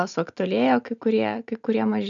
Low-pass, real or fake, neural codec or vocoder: 7.2 kHz; real; none